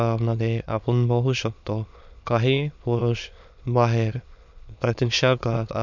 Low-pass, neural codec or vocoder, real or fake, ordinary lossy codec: 7.2 kHz; autoencoder, 22.05 kHz, a latent of 192 numbers a frame, VITS, trained on many speakers; fake; none